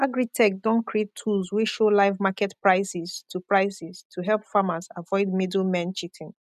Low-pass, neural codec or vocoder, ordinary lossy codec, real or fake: 14.4 kHz; none; none; real